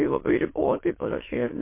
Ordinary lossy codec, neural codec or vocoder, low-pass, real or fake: MP3, 24 kbps; autoencoder, 22.05 kHz, a latent of 192 numbers a frame, VITS, trained on many speakers; 3.6 kHz; fake